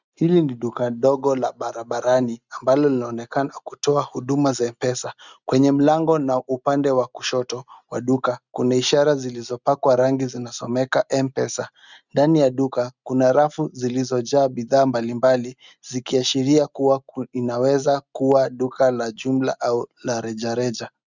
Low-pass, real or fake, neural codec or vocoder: 7.2 kHz; real; none